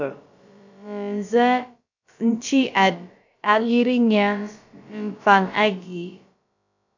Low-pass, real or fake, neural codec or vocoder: 7.2 kHz; fake; codec, 16 kHz, about 1 kbps, DyCAST, with the encoder's durations